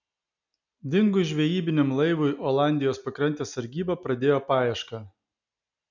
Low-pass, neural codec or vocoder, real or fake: 7.2 kHz; none; real